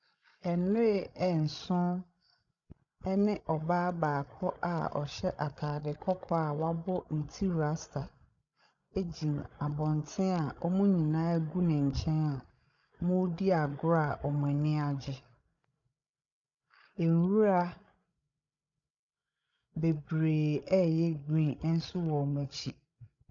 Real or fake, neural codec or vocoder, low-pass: fake; codec, 16 kHz, 16 kbps, FreqCodec, larger model; 7.2 kHz